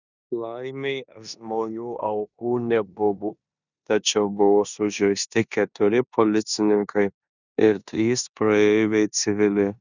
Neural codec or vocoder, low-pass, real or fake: codec, 16 kHz in and 24 kHz out, 0.9 kbps, LongCat-Audio-Codec, four codebook decoder; 7.2 kHz; fake